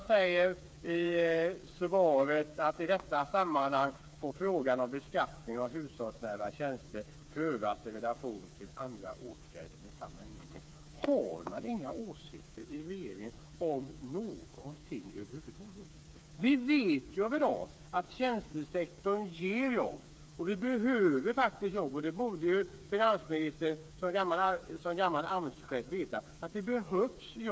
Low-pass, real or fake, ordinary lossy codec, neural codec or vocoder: none; fake; none; codec, 16 kHz, 4 kbps, FreqCodec, smaller model